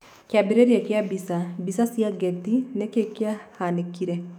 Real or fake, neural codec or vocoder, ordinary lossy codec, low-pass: fake; autoencoder, 48 kHz, 128 numbers a frame, DAC-VAE, trained on Japanese speech; none; 19.8 kHz